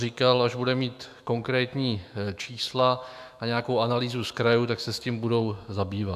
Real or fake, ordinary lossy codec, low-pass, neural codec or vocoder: fake; AAC, 96 kbps; 14.4 kHz; autoencoder, 48 kHz, 128 numbers a frame, DAC-VAE, trained on Japanese speech